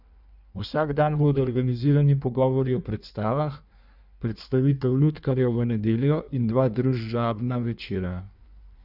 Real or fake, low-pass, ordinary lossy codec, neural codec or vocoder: fake; 5.4 kHz; none; codec, 16 kHz in and 24 kHz out, 1.1 kbps, FireRedTTS-2 codec